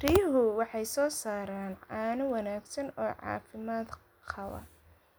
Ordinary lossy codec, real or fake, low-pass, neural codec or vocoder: none; real; none; none